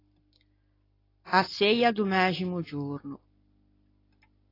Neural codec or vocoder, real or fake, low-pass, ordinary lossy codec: none; real; 5.4 kHz; AAC, 24 kbps